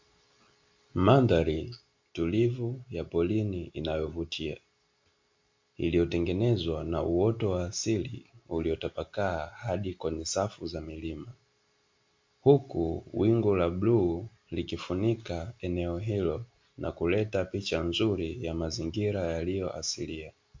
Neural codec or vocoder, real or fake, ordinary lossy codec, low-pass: none; real; MP3, 48 kbps; 7.2 kHz